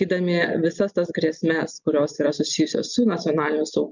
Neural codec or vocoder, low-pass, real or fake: none; 7.2 kHz; real